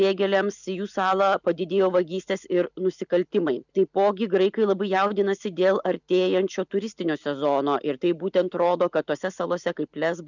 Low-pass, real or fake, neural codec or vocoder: 7.2 kHz; real; none